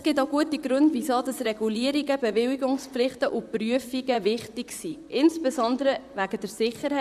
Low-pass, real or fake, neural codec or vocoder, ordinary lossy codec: 14.4 kHz; fake; vocoder, 44.1 kHz, 128 mel bands, Pupu-Vocoder; none